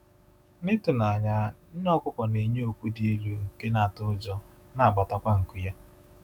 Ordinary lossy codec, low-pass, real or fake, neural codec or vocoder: none; none; fake; autoencoder, 48 kHz, 128 numbers a frame, DAC-VAE, trained on Japanese speech